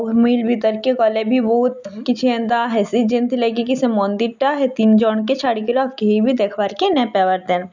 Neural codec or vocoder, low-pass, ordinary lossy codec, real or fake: none; 7.2 kHz; none; real